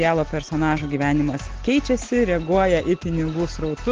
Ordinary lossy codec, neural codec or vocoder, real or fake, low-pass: Opus, 16 kbps; none; real; 7.2 kHz